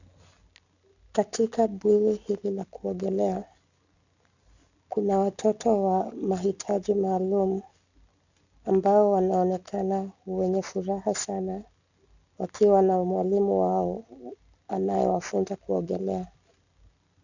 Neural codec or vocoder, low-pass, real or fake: none; 7.2 kHz; real